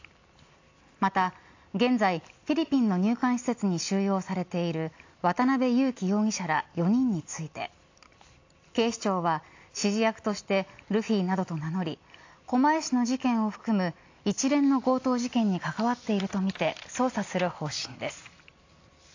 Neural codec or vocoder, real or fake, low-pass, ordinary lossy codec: none; real; 7.2 kHz; AAC, 48 kbps